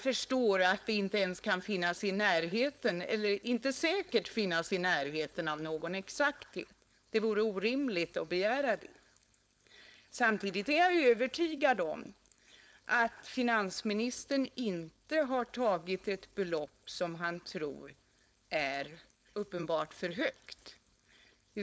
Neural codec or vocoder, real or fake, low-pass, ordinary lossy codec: codec, 16 kHz, 4.8 kbps, FACodec; fake; none; none